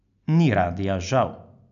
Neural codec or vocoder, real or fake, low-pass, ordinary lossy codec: none; real; 7.2 kHz; none